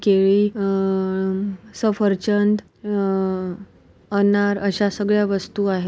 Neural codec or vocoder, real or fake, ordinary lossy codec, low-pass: none; real; none; none